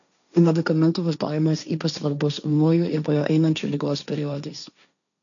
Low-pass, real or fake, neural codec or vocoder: 7.2 kHz; fake; codec, 16 kHz, 1.1 kbps, Voila-Tokenizer